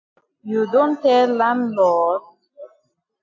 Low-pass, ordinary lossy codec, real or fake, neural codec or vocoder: 7.2 kHz; AAC, 48 kbps; real; none